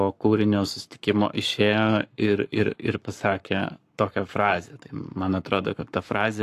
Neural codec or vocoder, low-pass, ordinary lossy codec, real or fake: codec, 44.1 kHz, 7.8 kbps, Pupu-Codec; 14.4 kHz; AAC, 64 kbps; fake